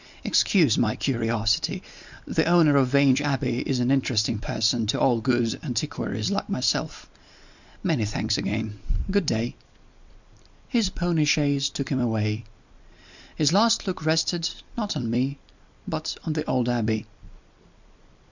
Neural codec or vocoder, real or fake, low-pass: vocoder, 44.1 kHz, 128 mel bands every 256 samples, BigVGAN v2; fake; 7.2 kHz